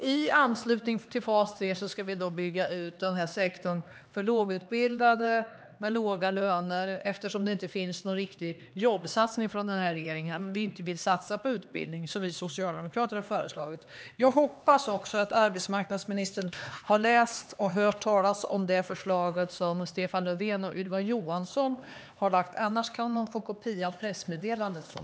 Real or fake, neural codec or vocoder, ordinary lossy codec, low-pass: fake; codec, 16 kHz, 2 kbps, X-Codec, HuBERT features, trained on LibriSpeech; none; none